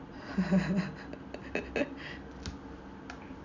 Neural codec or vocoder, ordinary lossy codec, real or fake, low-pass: none; none; real; 7.2 kHz